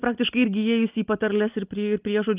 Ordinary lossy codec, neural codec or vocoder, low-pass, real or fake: Opus, 24 kbps; none; 3.6 kHz; real